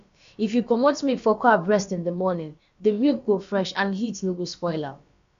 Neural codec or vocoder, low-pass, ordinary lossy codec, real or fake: codec, 16 kHz, about 1 kbps, DyCAST, with the encoder's durations; 7.2 kHz; MP3, 64 kbps; fake